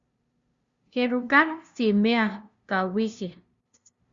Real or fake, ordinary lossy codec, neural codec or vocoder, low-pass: fake; Opus, 64 kbps; codec, 16 kHz, 0.5 kbps, FunCodec, trained on LibriTTS, 25 frames a second; 7.2 kHz